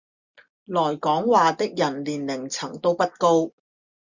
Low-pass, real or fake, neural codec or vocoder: 7.2 kHz; real; none